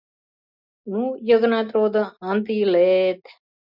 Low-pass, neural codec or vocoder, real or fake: 5.4 kHz; none; real